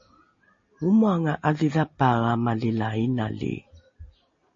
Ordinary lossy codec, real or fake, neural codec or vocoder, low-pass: MP3, 32 kbps; real; none; 7.2 kHz